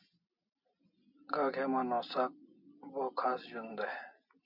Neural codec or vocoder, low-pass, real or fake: none; 5.4 kHz; real